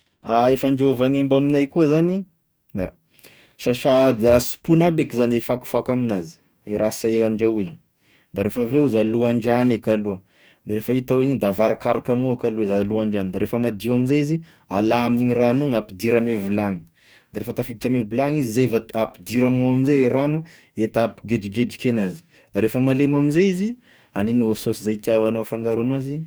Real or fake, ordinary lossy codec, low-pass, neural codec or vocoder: fake; none; none; codec, 44.1 kHz, 2.6 kbps, DAC